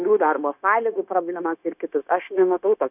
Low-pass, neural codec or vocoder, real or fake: 3.6 kHz; codec, 16 kHz, 0.9 kbps, LongCat-Audio-Codec; fake